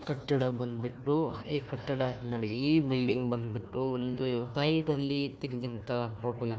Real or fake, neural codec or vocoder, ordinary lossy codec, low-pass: fake; codec, 16 kHz, 1 kbps, FunCodec, trained on Chinese and English, 50 frames a second; none; none